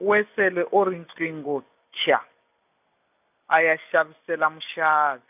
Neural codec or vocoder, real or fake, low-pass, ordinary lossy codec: none; real; 3.6 kHz; none